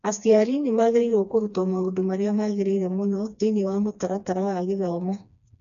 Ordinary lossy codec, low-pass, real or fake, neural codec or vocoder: none; 7.2 kHz; fake; codec, 16 kHz, 2 kbps, FreqCodec, smaller model